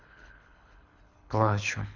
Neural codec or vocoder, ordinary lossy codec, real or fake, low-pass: codec, 24 kHz, 6 kbps, HILCodec; none; fake; 7.2 kHz